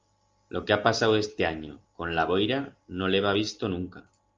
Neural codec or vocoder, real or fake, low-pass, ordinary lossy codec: none; real; 7.2 kHz; Opus, 32 kbps